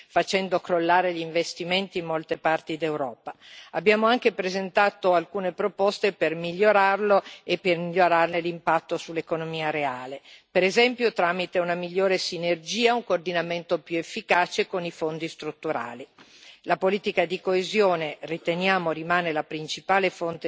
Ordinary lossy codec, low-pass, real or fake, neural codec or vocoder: none; none; real; none